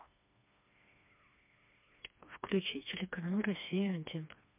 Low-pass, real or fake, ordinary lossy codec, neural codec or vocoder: 3.6 kHz; fake; MP3, 32 kbps; codec, 16 kHz, 2 kbps, FreqCodec, smaller model